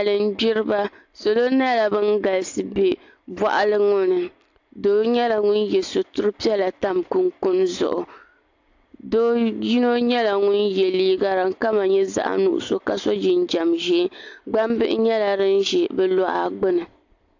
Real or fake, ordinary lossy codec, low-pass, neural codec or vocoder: real; AAC, 48 kbps; 7.2 kHz; none